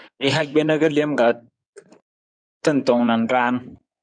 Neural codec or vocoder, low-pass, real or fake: codec, 16 kHz in and 24 kHz out, 2.2 kbps, FireRedTTS-2 codec; 9.9 kHz; fake